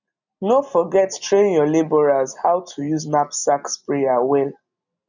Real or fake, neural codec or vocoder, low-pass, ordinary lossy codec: real; none; 7.2 kHz; none